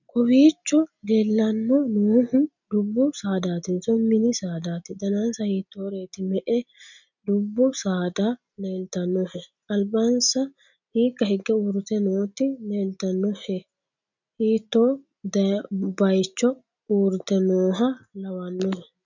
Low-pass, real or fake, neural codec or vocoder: 7.2 kHz; real; none